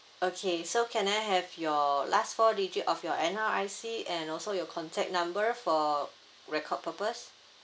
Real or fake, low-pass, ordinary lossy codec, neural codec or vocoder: real; none; none; none